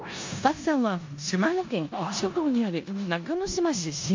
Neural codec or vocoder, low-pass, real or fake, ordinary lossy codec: codec, 16 kHz in and 24 kHz out, 0.9 kbps, LongCat-Audio-Codec, four codebook decoder; 7.2 kHz; fake; MP3, 48 kbps